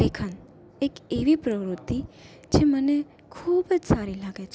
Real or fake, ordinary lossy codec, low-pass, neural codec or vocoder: real; none; none; none